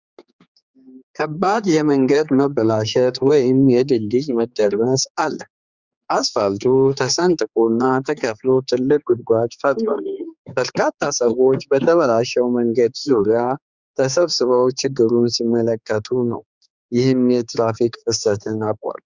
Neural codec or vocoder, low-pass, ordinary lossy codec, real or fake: codec, 16 kHz, 4 kbps, X-Codec, HuBERT features, trained on general audio; 7.2 kHz; Opus, 64 kbps; fake